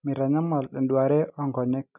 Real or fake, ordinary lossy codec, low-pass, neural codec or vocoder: real; none; 3.6 kHz; none